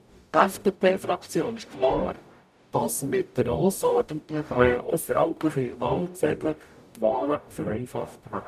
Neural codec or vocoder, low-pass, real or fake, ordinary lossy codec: codec, 44.1 kHz, 0.9 kbps, DAC; 14.4 kHz; fake; none